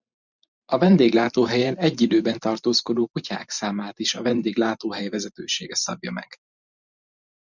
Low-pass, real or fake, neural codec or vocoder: 7.2 kHz; fake; vocoder, 44.1 kHz, 128 mel bands every 512 samples, BigVGAN v2